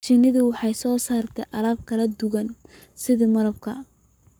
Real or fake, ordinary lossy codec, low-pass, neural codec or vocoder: fake; none; none; codec, 44.1 kHz, 7.8 kbps, Pupu-Codec